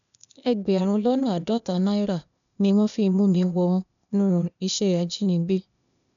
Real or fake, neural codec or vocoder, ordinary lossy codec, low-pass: fake; codec, 16 kHz, 0.8 kbps, ZipCodec; none; 7.2 kHz